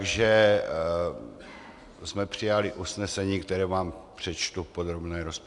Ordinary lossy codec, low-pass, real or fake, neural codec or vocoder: AAC, 64 kbps; 10.8 kHz; real; none